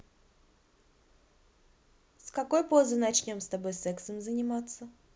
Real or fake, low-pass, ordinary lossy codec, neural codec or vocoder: real; none; none; none